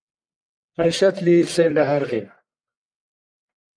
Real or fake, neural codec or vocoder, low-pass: fake; codec, 44.1 kHz, 1.7 kbps, Pupu-Codec; 9.9 kHz